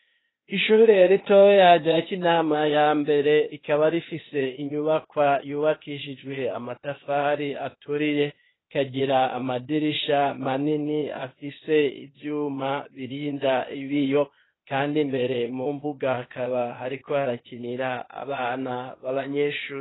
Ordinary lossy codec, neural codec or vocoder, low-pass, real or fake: AAC, 16 kbps; codec, 16 kHz, 0.8 kbps, ZipCodec; 7.2 kHz; fake